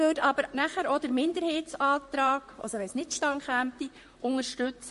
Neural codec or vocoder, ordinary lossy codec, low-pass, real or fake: vocoder, 44.1 kHz, 128 mel bands, Pupu-Vocoder; MP3, 48 kbps; 14.4 kHz; fake